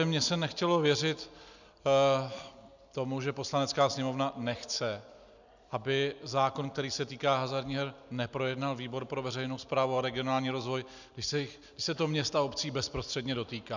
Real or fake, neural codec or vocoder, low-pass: real; none; 7.2 kHz